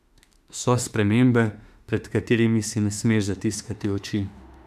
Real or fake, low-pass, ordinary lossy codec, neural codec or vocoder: fake; 14.4 kHz; none; autoencoder, 48 kHz, 32 numbers a frame, DAC-VAE, trained on Japanese speech